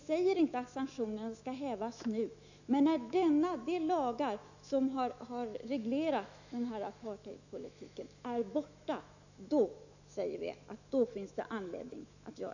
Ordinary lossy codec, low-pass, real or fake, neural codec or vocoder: none; 7.2 kHz; fake; autoencoder, 48 kHz, 128 numbers a frame, DAC-VAE, trained on Japanese speech